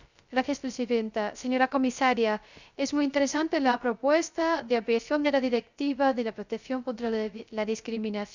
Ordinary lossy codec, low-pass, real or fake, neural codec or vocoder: none; 7.2 kHz; fake; codec, 16 kHz, 0.3 kbps, FocalCodec